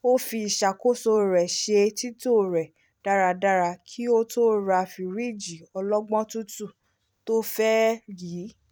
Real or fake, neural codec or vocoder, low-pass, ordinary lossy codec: fake; vocoder, 44.1 kHz, 128 mel bands every 256 samples, BigVGAN v2; 19.8 kHz; none